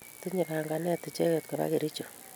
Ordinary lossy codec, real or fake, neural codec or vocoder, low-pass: none; real; none; none